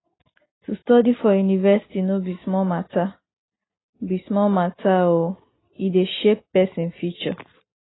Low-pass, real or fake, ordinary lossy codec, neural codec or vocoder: 7.2 kHz; real; AAC, 16 kbps; none